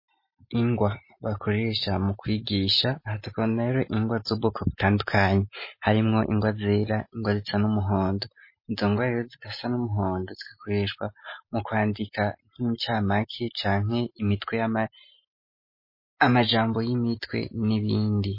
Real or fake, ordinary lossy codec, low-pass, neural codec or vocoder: real; MP3, 24 kbps; 5.4 kHz; none